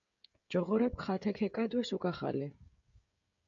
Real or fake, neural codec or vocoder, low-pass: fake; codec, 16 kHz, 16 kbps, FreqCodec, smaller model; 7.2 kHz